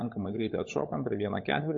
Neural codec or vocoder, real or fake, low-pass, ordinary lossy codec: codec, 16 kHz, 16 kbps, FunCodec, trained on LibriTTS, 50 frames a second; fake; 7.2 kHz; MP3, 32 kbps